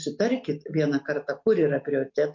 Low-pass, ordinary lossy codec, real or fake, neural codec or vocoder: 7.2 kHz; MP3, 48 kbps; real; none